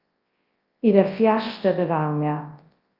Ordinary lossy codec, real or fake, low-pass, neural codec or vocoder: Opus, 32 kbps; fake; 5.4 kHz; codec, 24 kHz, 0.9 kbps, WavTokenizer, large speech release